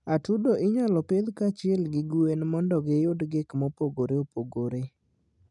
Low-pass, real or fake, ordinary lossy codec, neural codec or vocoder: 10.8 kHz; real; none; none